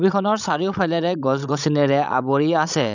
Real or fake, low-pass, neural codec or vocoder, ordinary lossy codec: fake; 7.2 kHz; codec, 16 kHz, 16 kbps, FreqCodec, larger model; none